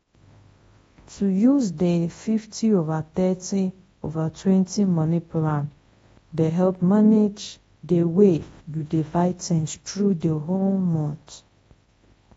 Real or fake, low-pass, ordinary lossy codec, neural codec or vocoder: fake; 10.8 kHz; AAC, 24 kbps; codec, 24 kHz, 0.9 kbps, WavTokenizer, large speech release